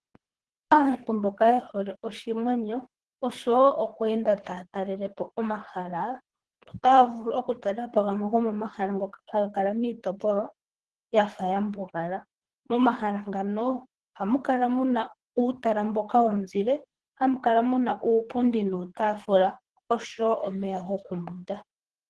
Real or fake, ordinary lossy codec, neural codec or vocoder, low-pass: fake; Opus, 16 kbps; codec, 24 kHz, 3 kbps, HILCodec; 10.8 kHz